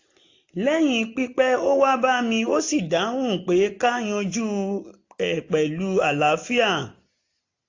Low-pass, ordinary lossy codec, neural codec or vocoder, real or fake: 7.2 kHz; AAC, 48 kbps; none; real